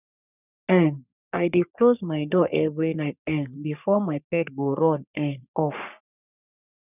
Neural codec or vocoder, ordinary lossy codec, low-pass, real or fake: codec, 44.1 kHz, 3.4 kbps, Pupu-Codec; none; 3.6 kHz; fake